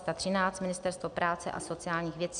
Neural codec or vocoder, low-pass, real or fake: none; 9.9 kHz; real